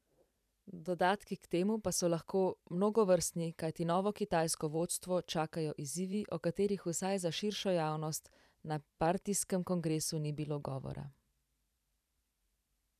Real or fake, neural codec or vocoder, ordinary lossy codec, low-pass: fake; vocoder, 44.1 kHz, 128 mel bands every 512 samples, BigVGAN v2; none; 14.4 kHz